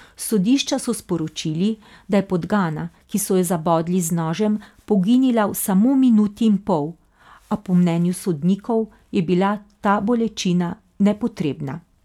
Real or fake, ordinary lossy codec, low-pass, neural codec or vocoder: real; none; 19.8 kHz; none